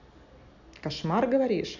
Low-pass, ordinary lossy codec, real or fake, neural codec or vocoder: 7.2 kHz; none; real; none